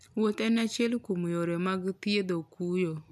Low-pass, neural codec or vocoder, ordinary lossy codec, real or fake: none; none; none; real